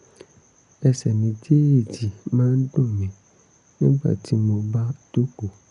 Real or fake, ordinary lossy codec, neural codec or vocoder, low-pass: real; none; none; 10.8 kHz